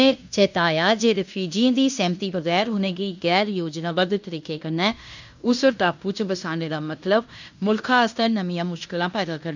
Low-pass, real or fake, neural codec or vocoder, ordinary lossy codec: 7.2 kHz; fake; codec, 16 kHz in and 24 kHz out, 0.9 kbps, LongCat-Audio-Codec, fine tuned four codebook decoder; none